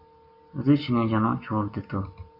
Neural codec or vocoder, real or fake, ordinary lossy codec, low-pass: none; real; AAC, 48 kbps; 5.4 kHz